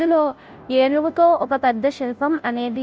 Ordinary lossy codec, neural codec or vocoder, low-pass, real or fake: none; codec, 16 kHz, 0.5 kbps, FunCodec, trained on Chinese and English, 25 frames a second; none; fake